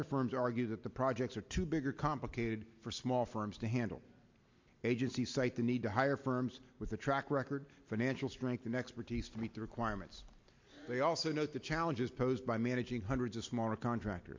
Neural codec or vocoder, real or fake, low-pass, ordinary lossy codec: none; real; 7.2 kHz; MP3, 48 kbps